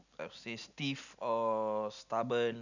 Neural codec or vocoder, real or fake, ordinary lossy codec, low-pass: none; real; none; 7.2 kHz